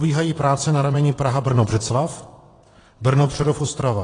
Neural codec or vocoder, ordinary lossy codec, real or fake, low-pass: vocoder, 22.05 kHz, 80 mel bands, WaveNeXt; AAC, 32 kbps; fake; 9.9 kHz